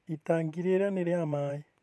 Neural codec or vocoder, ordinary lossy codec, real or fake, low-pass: vocoder, 24 kHz, 100 mel bands, Vocos; none; fake; none